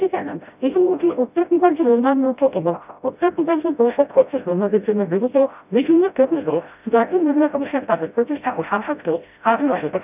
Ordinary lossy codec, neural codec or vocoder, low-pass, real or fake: none; codec, 16 kHz, 0.5 kbps, FreqCodec, smaller model; 3.6 kHz; fake